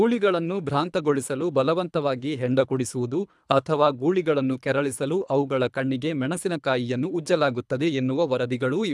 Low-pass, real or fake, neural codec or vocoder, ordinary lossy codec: none; fake; codec, 24 kHz, 3 kbps, HILCodec; none